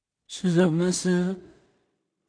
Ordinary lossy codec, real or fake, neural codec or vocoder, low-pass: AAC, 64 kbps; fake; codec, 16 kHz in and 24 kHz out, 0.4 kbps, LongCat-Audio-Codec, two codebook decoder; 9.9 kHz